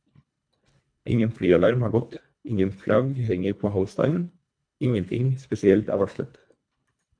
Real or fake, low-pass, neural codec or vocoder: fake; 9.9 kHz; codec, 24 kHz, 1.5 kbps, HILCodec